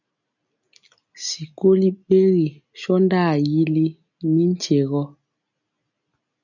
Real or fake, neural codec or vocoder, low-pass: real; none; 7.2 kHz